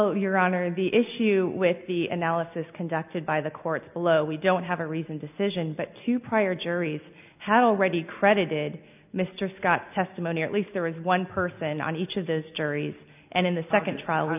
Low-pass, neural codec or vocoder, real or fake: 3.6 kHz; none; real